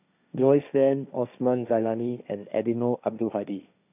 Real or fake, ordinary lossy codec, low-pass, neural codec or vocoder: fake; none; 3.6 kHz; codec, 16 kHz, 1.1 kbps, Voila-Tokenizer